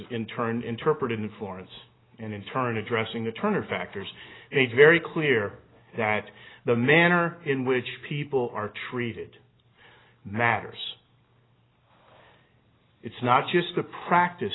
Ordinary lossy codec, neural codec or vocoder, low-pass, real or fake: AAC, 16 kbps; none; 7.2 kHz; real